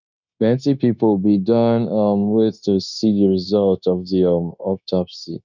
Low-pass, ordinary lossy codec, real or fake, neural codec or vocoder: 7.2 kHz; none; fake; codec, 16 kHz, 0.9 kbps, LongCat-Audio-Codec